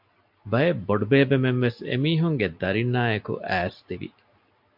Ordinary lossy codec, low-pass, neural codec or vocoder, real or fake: MP3, 48 kbps; 5.4 kHz; none; real